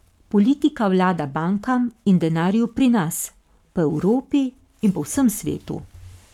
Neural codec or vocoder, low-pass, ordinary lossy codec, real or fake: codec, 44.1 kHz, 7.8 kbps, Pupu-Codec; 19.8 kHz; none; fake